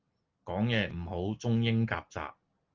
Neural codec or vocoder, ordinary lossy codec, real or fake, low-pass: none; Opus, 32 kbps; real; 7.2 kHz